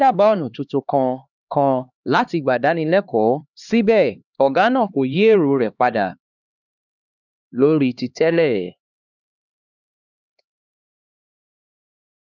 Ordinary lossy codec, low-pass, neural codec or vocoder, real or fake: none; 7.2 kHz; codec, 16 kHz, 2 kbps, X-Codec, HuBERT features, trained on LibriSpeech; fake